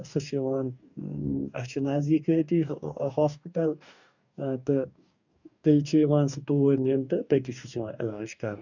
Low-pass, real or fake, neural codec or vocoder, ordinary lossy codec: 7.2 kHz; fake; codec, 44.1 kHz, 2.6 kbps, DAC; none